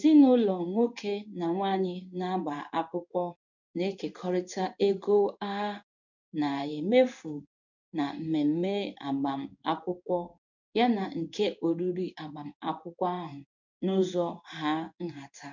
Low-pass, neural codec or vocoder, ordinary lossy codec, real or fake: 7.2 kHz; codec, 16 kHz in and 24 kHz out, 1 kbps, XY-Tokenizer; none; fake